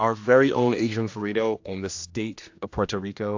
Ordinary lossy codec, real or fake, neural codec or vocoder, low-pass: AAC, 48 kbps; fake; codec, 16 kHz, 1 kbps, X-Codec, HuBERT features, trained on general audio; 7.2 kHz